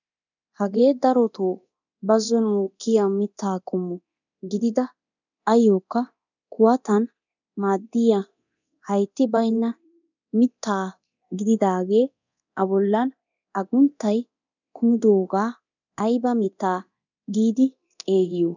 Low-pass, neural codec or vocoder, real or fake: 7.2 kHz; codec, 24 kHz, 0.9 kbps, DualCodec; fake